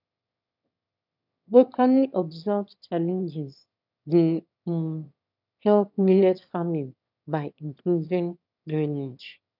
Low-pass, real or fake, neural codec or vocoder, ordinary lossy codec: 5.4 kHz; fake; autoencoder, 22.05 kHz, a latent of 192 numbers a frame, VITS, trained on one speaker; none